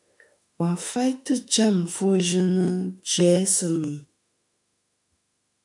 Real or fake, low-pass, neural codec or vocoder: fake; 10.8 kHz; autoencoder, 48 kHz, 32 numbers a frame, DAC-VAE, trained on Japanese speech